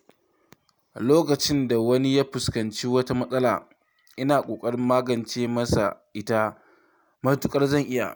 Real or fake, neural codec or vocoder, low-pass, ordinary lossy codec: real; none; none; none